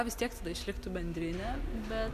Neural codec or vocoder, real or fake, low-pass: none; real; 14.4 kHz